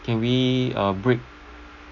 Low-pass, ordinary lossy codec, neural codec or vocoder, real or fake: 7.2 kHz; none; none; real